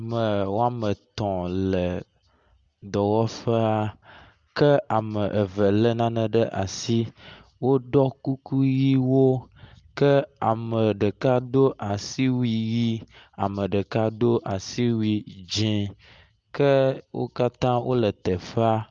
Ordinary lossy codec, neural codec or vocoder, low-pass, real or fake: Opus, 32 kbps; none; 7.2 kHz; real